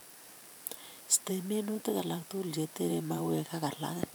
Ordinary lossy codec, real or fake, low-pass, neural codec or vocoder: none; fake; none; vocoder, 44.1 kHz, 128 mel bands every 512 samples, BigVGAN v2